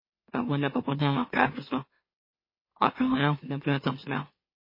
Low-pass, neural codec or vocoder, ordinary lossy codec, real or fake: 5.4 kHz; autoencoder, 44.1 kHz, a latent of 192 numbers a frame, MeloTTS; MP3, 24 kbps; fake